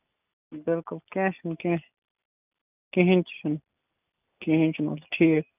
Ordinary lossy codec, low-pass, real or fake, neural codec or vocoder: none; 3.6 kHz; real; none